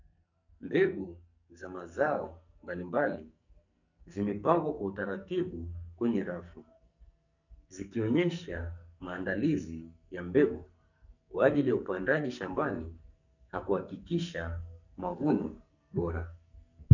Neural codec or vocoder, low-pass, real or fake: codec, 44.1 kHz, 2.6 kbps, SNAC; 7.2 kHz; fake